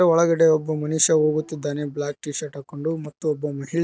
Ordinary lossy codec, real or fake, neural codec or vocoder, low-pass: none; real; none; none